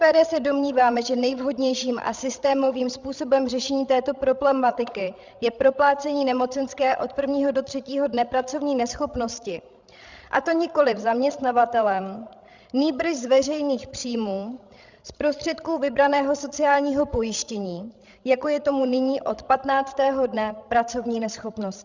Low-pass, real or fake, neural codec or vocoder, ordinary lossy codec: 7.2 kHz; fake; codec, 16 kHz, 16 kbps, FreqCodec, larger model; Opus, 64 kbps